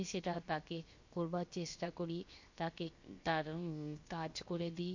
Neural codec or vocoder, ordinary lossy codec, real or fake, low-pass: codec, 16 kHz, 0.7 kbps, FocalCodec; MP3, 48 kbps; fake; 7.2 kHz